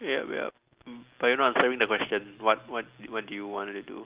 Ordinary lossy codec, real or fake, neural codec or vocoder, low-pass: Opus, 32 kbps; real; none; 3.6 kHz